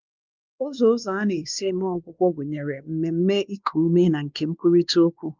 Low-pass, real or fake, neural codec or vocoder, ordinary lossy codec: 7.2 kHz; fake; codec, 16 kHz, 2 kbps, X-Codec, WavLM features, trained on Multilingual LibriSpeech; Opus, 24 kbps